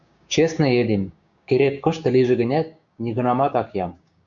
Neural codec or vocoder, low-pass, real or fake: codec, 16 kHz, 6 kbps, DAC; 7.2 kHz; fake